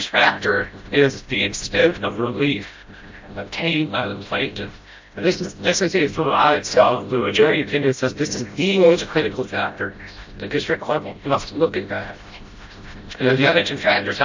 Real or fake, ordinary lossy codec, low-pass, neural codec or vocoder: fake; MP3, 48 kbps; 7.2 kHz; codec, 16 kHz, 0.5 kbps, FreqCodec, smaller model